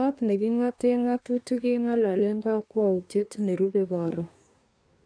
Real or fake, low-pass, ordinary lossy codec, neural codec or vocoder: fake; 9.9 kHz; none; codec, 24 kHz, 1 kbps, SNAC